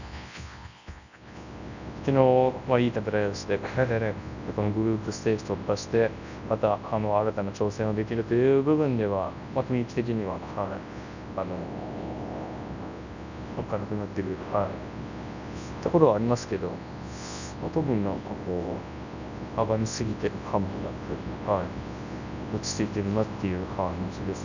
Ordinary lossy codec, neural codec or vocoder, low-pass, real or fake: none; codec, 24 kHz, 0.9 kbps, WavTokenizer, large speech release; 7.2 kHz; fake